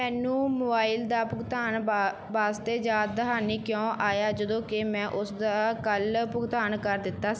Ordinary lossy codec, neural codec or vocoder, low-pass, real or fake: none; none; none; real